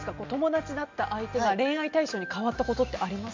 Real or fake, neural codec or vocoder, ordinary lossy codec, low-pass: real; none; MP3, 64 kbps; 7.2 kHz